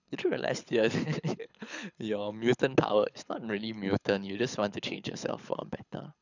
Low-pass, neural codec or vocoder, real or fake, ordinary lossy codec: 7.2 kHz; codec, 24 kHz, 6 kbps, HILCodec; fake; none